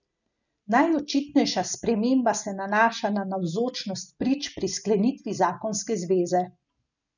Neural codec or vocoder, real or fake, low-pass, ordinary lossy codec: none; real; 7.2 kHz; none